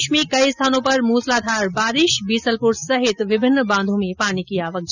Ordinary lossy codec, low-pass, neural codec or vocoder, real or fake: none; 7.2 kHz; none; real